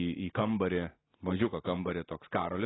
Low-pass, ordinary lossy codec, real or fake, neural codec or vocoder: 7.2 kHz; AAC, 16 kbps; real; none